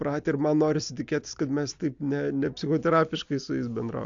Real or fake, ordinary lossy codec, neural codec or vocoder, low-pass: real; AAC, 64 kbps; none; 7.2 kHz